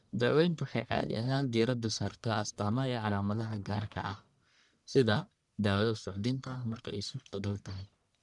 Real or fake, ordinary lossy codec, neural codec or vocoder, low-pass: fake; none; codec, 44.1 kHz, 1.7 kbps, Pupu-Codec; 10.8 kHz